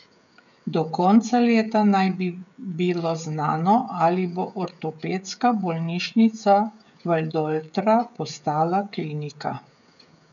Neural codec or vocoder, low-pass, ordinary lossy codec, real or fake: codec, 16 kHz, 16 kbps, FreqCodec, smaller model; 7.2 kHz; none; fake